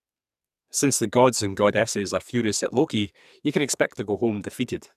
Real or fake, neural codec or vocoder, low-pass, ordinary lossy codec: fake; codec, 44.1 kHz, 2.6 kbps, SNAC; 14.4 kHz; none